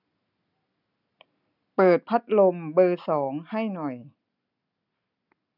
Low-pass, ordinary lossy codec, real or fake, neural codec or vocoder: 5.4 kHz; none; real; none